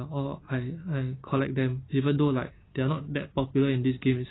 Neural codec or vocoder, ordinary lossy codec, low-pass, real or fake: none; AAC, 16 kbps; 7.2 kHz; real